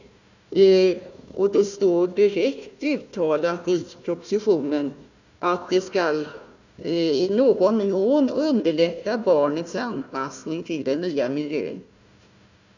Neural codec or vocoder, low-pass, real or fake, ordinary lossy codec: codec, 16 kHz, 1 kbps, FunCodec, trained on Chinese and English, 50 frames a second; 7.2 kHz; fake; none